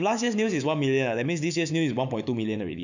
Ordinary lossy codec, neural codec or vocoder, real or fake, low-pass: none; autoencoder, 48 kHz, 128 numbers a frame, DAC-VAE, trained on Japanese speech; fake; 7.2 kHz